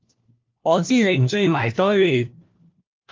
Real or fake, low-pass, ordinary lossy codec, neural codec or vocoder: fake; 7.2 kHz; Opus, 24 kbps; codec, 16 kHz, 1 kbps, FunCodec, trained on LibriTTS, 50 frames a second